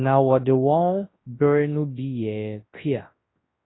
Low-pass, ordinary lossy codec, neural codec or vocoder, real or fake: 7.2 kHz; AAC, 16 kbps; codec, 24 kHz, 0.9 kbps, WavTokenizer, large speech release; fake